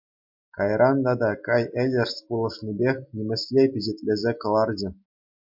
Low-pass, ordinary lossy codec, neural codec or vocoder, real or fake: 5.4 kHz; MP3, 48 kbps; none; real